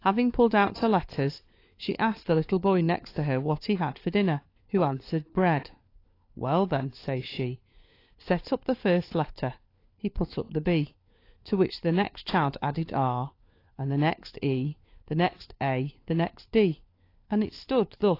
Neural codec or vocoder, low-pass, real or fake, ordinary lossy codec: codec, 16 kHz, 4 kbps, FunCodec, trained on LibriTTS, 50 frames a second; 5.4 kHz; fake; AAC, 32 kbps